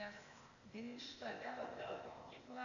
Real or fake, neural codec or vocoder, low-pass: fake; codec, 16 kHz, 0.8 kbps, ZipCodec; 7.2 kHz